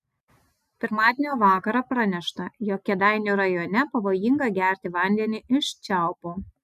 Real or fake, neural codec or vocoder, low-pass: real; none; 14.4 kHz